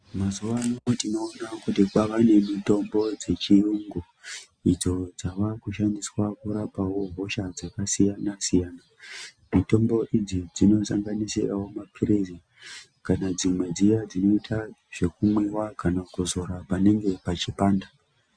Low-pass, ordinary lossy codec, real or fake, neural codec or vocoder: 9.9 kHz; Opus, 64 kbps; real; none